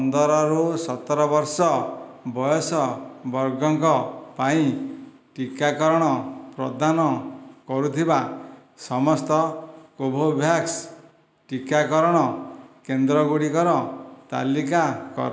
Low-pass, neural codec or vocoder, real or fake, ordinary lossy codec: none; none; real; none